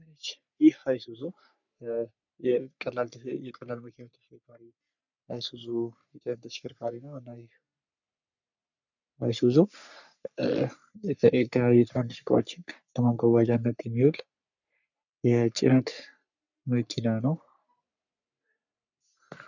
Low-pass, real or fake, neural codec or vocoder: 7.2 kHz; fake; codec, 44.1 kHz, 3.4 kbps, Pupu-Codec